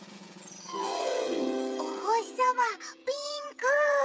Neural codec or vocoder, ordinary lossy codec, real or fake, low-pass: codec, 16 kHz, 16 kbps, FreqCodec, smaller model; none; fake; none